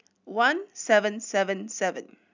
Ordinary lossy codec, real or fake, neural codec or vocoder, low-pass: none; real; none; 7.2 kHz